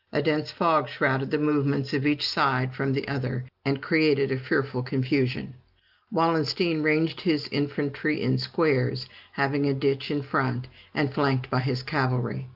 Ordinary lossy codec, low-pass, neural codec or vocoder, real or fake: Opus, 32 kbps; 5.4 kHz; none; real